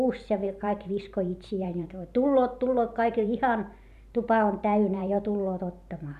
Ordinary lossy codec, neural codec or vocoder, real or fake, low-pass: none; vocoder, 44.1 kHz, 128 mel bands every 512 samples, BigVGAN v2; fake; 14.4 kHz